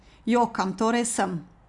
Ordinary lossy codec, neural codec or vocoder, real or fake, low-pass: Opus, 64 kbps; none; real; 10.8 kHz